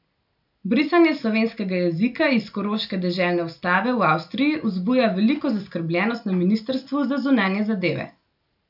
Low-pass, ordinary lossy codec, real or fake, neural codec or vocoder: 5.4 kHz; none; real; none